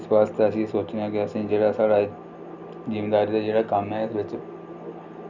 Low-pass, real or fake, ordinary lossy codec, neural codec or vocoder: 7.2 kHz; real; Opus, 64 kbps; none